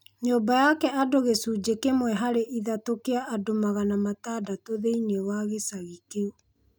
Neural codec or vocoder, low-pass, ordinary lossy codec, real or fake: none; none; none; real